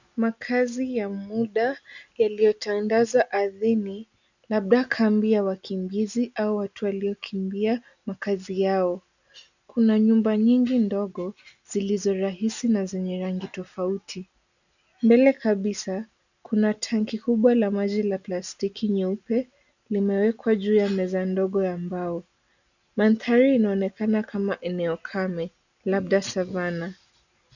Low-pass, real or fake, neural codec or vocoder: 7.2 kHz; real; none